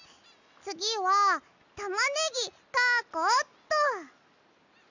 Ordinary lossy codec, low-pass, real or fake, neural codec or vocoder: none; 7.2 kHz; real; none